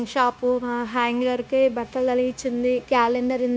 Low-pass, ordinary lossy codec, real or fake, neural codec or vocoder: none; none; fake; codec, 16 kHz, 0.9 kbps, LongCat-Audio-Codec